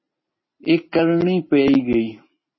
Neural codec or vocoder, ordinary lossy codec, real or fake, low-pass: none; MP3, 24 kbps; real; 7.2 kHz